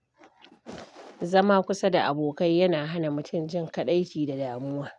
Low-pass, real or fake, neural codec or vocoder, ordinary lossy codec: 10.8 kHz; real; none; none